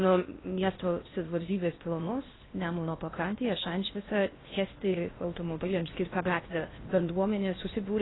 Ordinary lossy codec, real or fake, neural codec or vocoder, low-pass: AAC, 16 kbps; fake; codec, 16 kHz in and 24 kHz out, 0.6 kbps, FocalCodec, streaming, 4096 codes; 7.2 kHz